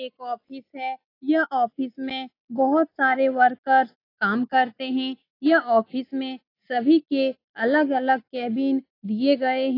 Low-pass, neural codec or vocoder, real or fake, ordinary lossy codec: 5.4 kHz; none; real; AAC, 32 kbps